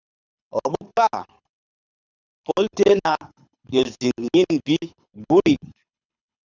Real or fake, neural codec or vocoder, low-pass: fake; codec, 24 kHz, 6 kbps, HILCodec; 7.2 kHz